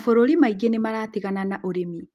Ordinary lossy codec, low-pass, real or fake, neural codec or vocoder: Opus, 24 kbps; 14.4 kHz; real; none